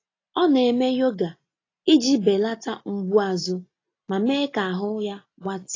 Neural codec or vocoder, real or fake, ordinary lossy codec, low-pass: none; real; AAC, 32 kbps; 7.2 kHz